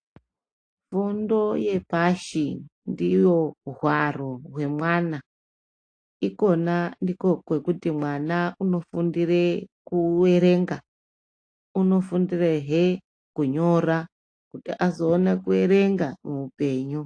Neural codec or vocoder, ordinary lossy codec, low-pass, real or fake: none; AAC, 48 kbps; 9.9 kHz; real